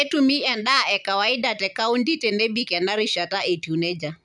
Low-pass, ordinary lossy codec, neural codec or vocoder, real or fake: 10.8 kHz; none; none; real